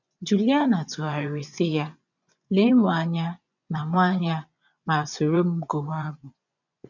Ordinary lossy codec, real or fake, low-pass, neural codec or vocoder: none; fake; 7.2 kHz; vocoder, 24 kHz, 100 mel bands, Vocos